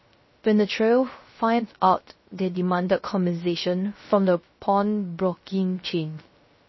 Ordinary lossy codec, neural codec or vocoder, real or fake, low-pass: MP3, 24 kbps; codec, 16 kHz, 0.3 kbps, FocalCodec; fake; 7.2 kHz